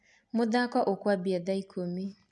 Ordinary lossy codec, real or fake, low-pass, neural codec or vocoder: none; real; 10.8 kHz; none